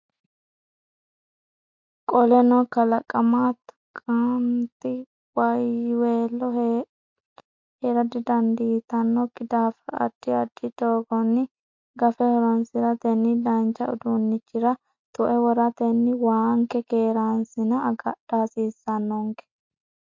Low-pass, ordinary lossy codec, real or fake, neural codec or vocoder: 7.2 kHz; MP3, 48 kbps; real; none